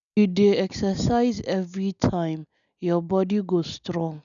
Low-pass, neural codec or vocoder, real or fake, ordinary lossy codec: 7.2 kHz; none; real; none